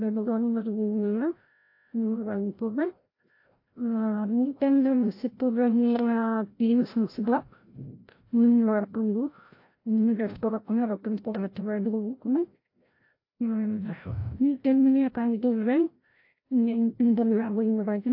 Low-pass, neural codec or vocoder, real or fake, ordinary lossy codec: 5.4 kHz; codec, 16 kHz, 0.5 kbps, FreqCodec, larger model; fake; AAC, 32 kbps